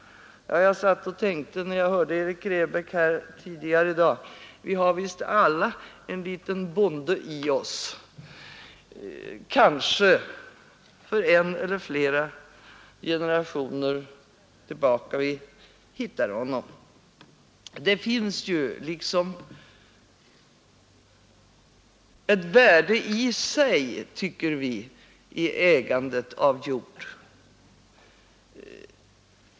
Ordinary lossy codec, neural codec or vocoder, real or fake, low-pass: none; none; real; none